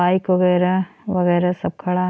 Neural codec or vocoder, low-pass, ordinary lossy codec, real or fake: none; none; none; real